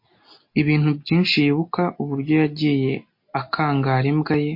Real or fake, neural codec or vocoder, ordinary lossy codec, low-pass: real; none; MP3, 32 kbps; 5.4 kHz